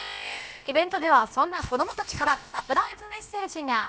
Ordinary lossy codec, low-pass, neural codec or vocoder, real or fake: none; none; codec, 16 kHz, about 1 kbps, DyCAST, with the encoder's durations; fake